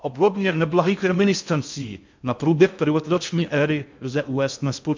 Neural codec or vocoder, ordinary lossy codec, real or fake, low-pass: codec, 16 kHz in and 24 kHz out, 0.6 kbps, FocalCodec, streaming, 2048 codes; MP3, 64 kbps; fake; 7.2 kHz